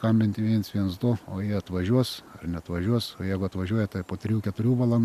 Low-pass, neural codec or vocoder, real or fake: 14.4 kHz; none; real